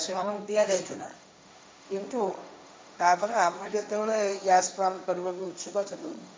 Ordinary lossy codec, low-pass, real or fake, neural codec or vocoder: none; none; fake; codec, 16 kHz, 1.1 kbps, Voila-Tokenizer